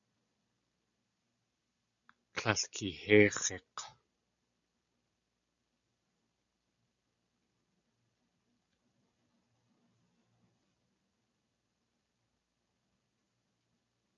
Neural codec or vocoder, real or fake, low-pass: none; real; 7.2 kHz